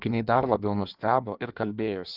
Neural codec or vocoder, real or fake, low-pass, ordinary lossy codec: codec, 16 kHz in and 24 kHz out, 1.1 kbps, FireRedTTS-2 codec; fake; 5.4 kHz; Opus, 32 kbps